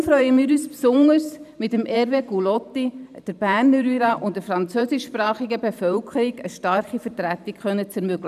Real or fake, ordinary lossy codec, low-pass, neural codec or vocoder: fake; none; 14.4 kHz; vocoder, 44.1 kHz, 128 mel bands every 512 samples, BigVGAN v2